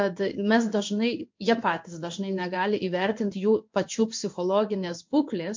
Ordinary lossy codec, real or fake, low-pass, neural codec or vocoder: MP3, 48 kbps; fake; 7.2 kHz; codec, 16 kHz in and 24 kHz out, 1 kbps, XY-Tokenizer